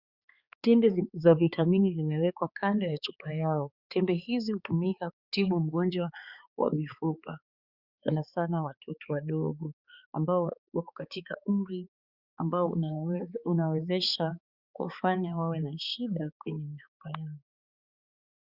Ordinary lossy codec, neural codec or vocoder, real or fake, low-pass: Opus, 64 kbps; codec, 16 kHz, 4 kbps, X-Codec, HuBERT features, trained on balanced general audio; fake; 5.4 kHz